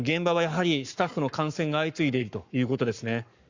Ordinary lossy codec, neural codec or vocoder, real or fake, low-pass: Opus, 64 kbps; codec, 44.1 kHz, 7.8 kbps, Pupu-Codec; fake; 7.2 kHz